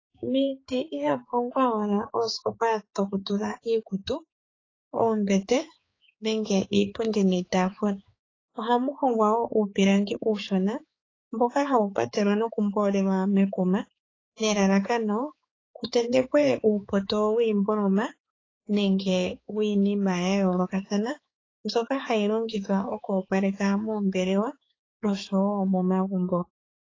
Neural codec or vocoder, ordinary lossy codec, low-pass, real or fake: codec, 16 kHz, 4 kbps, X-Codec, HuBERT features, trained on balanced general audio; AAC, 32 kbps; 7.2 kHz; fake